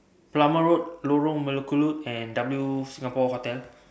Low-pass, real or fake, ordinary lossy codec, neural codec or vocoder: none; real; none; none